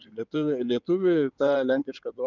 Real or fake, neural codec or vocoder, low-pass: fake; codec, 16 kHz in and 24 kHz out, 2.2 kbps, FireRedTTS-2 codec; 7.2 kHz